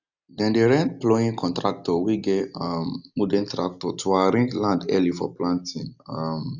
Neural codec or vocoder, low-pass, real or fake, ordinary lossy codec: none; 7.2 kHz; real; none